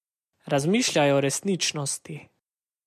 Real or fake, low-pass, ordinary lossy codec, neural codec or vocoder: real; 14.4 kHz; MP3, 64 kbps; none